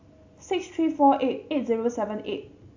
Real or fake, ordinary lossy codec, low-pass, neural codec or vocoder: real; AAC, 48 kbps; 7.2 kHz; none